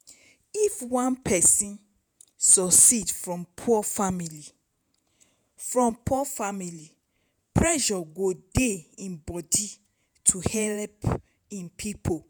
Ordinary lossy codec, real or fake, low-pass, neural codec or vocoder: none; fake; none; vocoder, 48 kHz, 128 mel bands, Vocos